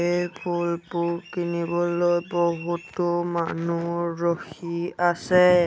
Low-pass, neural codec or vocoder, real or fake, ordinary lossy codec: none; none; real; none